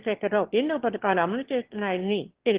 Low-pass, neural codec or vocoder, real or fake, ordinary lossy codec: 3.6 kHz; autoencoder, 22.05 kHz, a latent of 192 numbers a frame, VITS, trained on one speaker; fake; Opus, 16 kbps